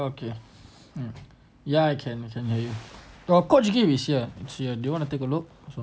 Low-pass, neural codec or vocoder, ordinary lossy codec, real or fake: none; none; none; real